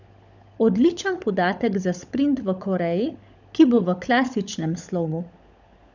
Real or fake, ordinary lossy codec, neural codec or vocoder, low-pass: fake; none; codec, 16 kHz, 16 kbps, FunCodec, trained on LibriTTS, 50 frames a second; 7.2 kHz